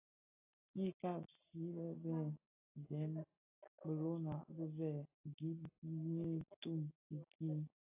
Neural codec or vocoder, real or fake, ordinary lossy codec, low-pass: none; real; AAC, 32 kbps; 3.6 kHz